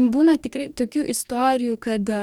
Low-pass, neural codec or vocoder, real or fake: 19.8 kHz; codec, 44.1 kHz, 2.6 kbps, DAC; fake